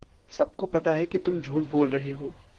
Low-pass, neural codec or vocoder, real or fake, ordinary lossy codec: 10.8 kHz; codec, 32 kHz, 1.9 kbps, SNAC; fake; Opus, 16 kbps